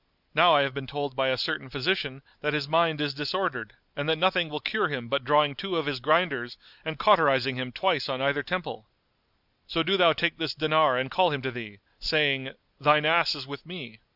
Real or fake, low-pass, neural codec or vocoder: real; 5.4 kHz; none